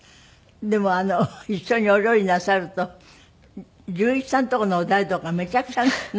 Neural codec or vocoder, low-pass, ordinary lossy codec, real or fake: none; none; none; real